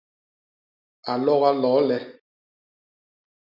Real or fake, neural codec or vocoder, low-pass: real; none; 5.4 kHz